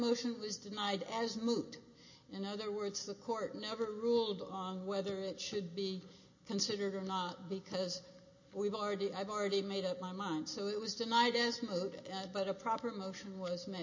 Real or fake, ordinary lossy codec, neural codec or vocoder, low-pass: real; MP3, 32 kbps; none; 7.2 kHz